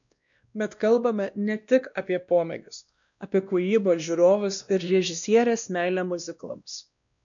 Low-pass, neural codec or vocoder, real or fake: 7.2 kHz; codec, 16 kHz, 1 kbps, X-Codec, WavLM features, trained on Multilingual LibriSpeech; fake